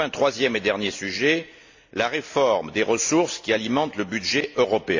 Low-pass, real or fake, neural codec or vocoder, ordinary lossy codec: 7.2 kHz; real; none; AAC, 48 kbps